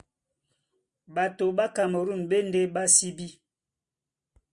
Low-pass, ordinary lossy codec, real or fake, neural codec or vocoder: 10.8 kHz; Opus, 64 kbps; real; none